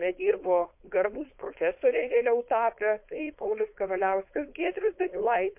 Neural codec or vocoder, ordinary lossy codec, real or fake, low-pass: codec, 16 kHz, 4.8 kbps, FACodec; MP3, 32 kbps; fake; 3.6 kHz